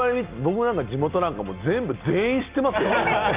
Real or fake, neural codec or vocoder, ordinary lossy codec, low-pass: real; none; Opus, 24 kbps; 3.6 kHz